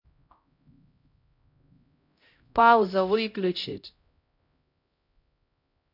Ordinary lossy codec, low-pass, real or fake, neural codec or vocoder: none; 5.4 kHz; fake; codec, 16 kHz, 0.5 kbps, X-Codec, HuBERT features, trained on LibriSpeech